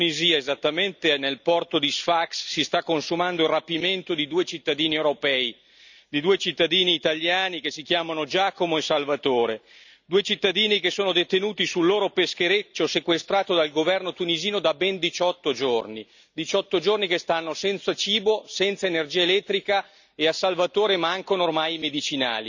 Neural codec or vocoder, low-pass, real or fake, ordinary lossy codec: none; 7.2 kHz; real; none